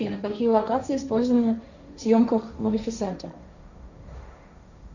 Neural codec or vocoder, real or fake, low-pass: codec, 16 kHz, 1.1 kbps, Voila-Tokenizer; fake; 7.2 kHz